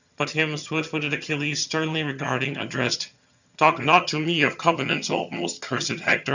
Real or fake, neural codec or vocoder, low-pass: fake; vocoder, 22.05 kHz, 80 mel bands, HiFi-GAN; 7.2 kHz